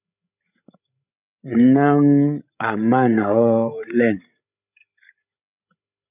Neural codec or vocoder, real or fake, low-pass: codec, 16 kHz, 16 kbps, FreqCodec, larger model; fake; 3.6 kHz